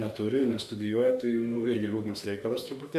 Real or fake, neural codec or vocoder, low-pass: fake; autoencoder, 48 kHz, 32 numbers a frame, DAC-VAE, trained on Japanese speech; 14.4 kHz